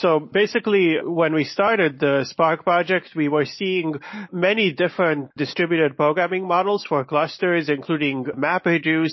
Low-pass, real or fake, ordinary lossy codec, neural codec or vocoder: 7.2 kHz; real; MP3, 24 kbps; none